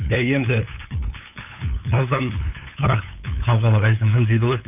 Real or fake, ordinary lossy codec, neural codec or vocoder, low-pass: fake; none; codec, 16 kHz, 8 kbps, FunCodec, trained on LibriTTS, 25 frames a second; 3.6 kHz